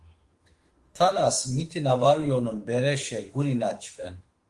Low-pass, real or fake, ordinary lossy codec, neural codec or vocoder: 10.8 kHz; fake; Opus, 24 kbps; autoencoder, 48 kHz, 32 numbers a frame, DAC-VAE, trained on Japanese speech